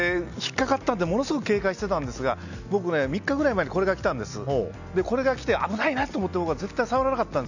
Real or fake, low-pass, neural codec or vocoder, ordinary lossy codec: real; 7.2 kHz; none; none